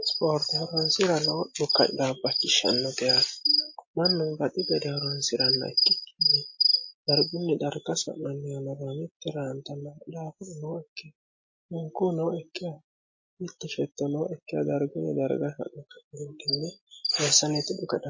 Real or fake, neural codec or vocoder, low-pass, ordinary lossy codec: real; none; 7.2 kHz; MP3, 32 kbps